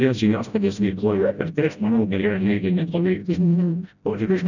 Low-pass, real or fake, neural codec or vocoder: 7.2 kHz; fake; codec, 16 kHz, 0.5 kbps, FreqCodec, smaller model